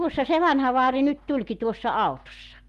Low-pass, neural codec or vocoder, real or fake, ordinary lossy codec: 14.4 kHz; none; real; Opus, 32 kbps